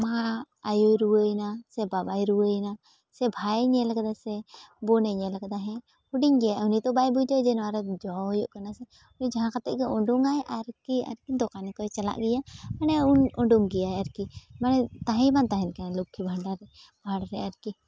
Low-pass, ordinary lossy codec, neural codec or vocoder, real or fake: none; none; none; real